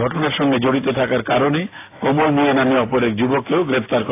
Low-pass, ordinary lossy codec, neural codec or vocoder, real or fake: 3.6 kHz; AAC, 24 kbps; none; real